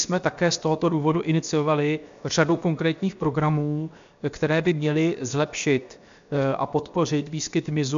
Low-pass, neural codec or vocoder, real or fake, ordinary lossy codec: 7.2 kHz; codec, 16 kHz, 0.7 kbps, FocalCodec; fake; MP3, 64 kbps